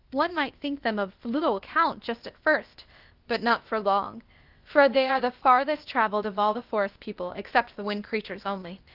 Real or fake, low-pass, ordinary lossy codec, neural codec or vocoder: fake; 5.4 kHz; Opus, 32 kbps; codec, 16 kHz, 0.8 kbps, ZipCodec